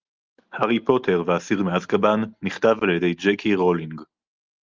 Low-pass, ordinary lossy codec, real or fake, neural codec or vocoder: 7.2 kHz; Opus, 24 kbps; real; none